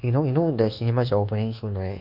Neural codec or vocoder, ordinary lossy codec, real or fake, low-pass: codec, 24 kHz, 1.2 kbps, DualCodec; none; fake; 5.4 kHz